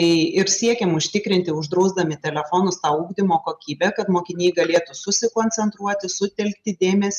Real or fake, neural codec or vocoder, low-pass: real; none; 14.4 kHz